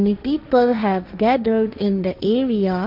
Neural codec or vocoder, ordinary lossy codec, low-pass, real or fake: codec, 16 kHz, 1.1 kbps, Voila-Tokenizer; none; 5.4 kHz; fake